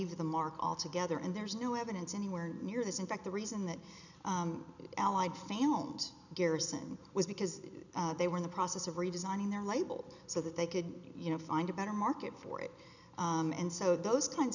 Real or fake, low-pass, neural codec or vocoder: real; 7.2 kHz; none